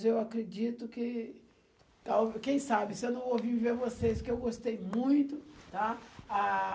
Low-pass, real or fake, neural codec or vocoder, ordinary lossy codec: none; real; none; none